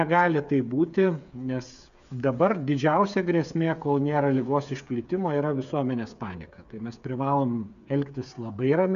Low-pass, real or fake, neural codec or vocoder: 7.2 kHz; fake; codec, 16 kHz, 8 kbps, FreqCodec, smaller model